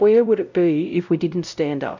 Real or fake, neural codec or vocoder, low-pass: fake; codec, 16 kHz, 0.5 kbps, X-Codec, WavLM features, trained on Multilingual LibriSpeech; 7.2 kHz